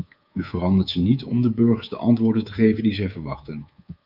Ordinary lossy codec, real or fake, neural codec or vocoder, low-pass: Opus, 24 kbps; fake; codec, 24 kHz, 3.1 kbps, DualCodec; 5.4 kHz